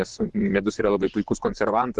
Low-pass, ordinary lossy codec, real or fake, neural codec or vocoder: 10.8 kHz; Opus, 16 kbps; fake; vocoder, 24 kHz, 100 mel bands, Vocos